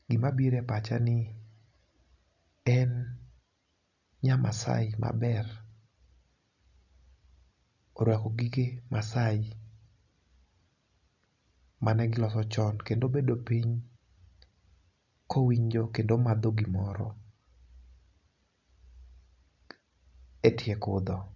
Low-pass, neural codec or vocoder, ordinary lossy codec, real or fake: 7.2 kHz; none; none; real